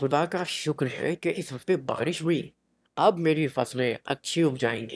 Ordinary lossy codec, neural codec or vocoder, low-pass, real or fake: none; autoencoder, 22.05 kHz, a latent of 192 numbers a frame, VITS, trained on one speaker; none; fake